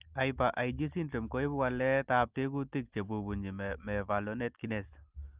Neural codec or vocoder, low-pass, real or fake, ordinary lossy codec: none; 3.6 kHz; real; Opus, 24 kbps